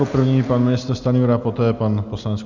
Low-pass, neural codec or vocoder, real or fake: 7.2 kHz; none; real